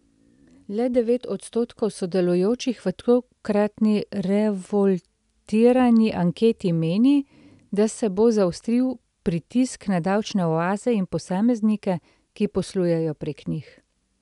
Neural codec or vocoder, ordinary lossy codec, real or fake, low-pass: none; none; real; 10.8 kHz